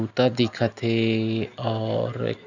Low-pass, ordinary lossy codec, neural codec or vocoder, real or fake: 7.2 kHz; none; none; real